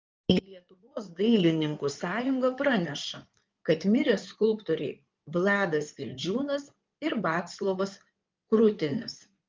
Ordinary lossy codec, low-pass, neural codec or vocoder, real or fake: Opus, 24 kbps; 7.2 kHz; codec, 16 kHz in and 24 kHz out, 2.2 kbps, FireRedTTS-2 codec; fake